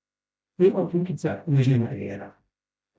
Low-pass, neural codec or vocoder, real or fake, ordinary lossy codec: none; codec, 16 kHz, 0.5 kbps, FreqCodec, smaller model; fake; none